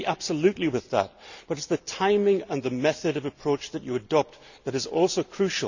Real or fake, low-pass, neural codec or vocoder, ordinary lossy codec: real; 7.2 kHz; none; none